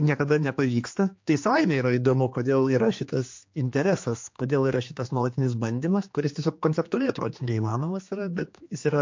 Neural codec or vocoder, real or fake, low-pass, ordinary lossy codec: codec, 16 kHz, 2 kbps, X-Codec, HuBERT features, trained on general audio; fake; 7.2 kHz; MP3, 48 kbps